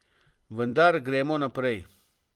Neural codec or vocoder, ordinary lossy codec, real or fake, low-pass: vocoder, 44.1 kHz, 128 mel bands every 256 samples, BigVGAN v2; Opus, 24 kbps; fake; 19.8 kHz